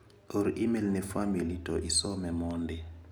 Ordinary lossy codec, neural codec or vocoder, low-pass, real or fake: none; none; none; real